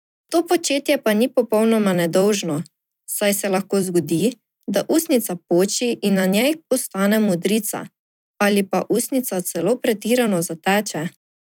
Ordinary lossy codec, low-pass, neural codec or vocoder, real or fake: none; 19.8 kHz; vocoder, 44.1 kHz, 128 mel bands every 256 samples, BigVGAN v2; fake